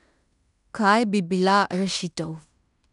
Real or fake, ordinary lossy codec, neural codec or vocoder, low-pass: fake; none; codec, 16 kHz in and 24 kHz out, 0.9 kbps, LongCat-Audio-Codec, fine tuned four codebook decoder; 10.8 kHz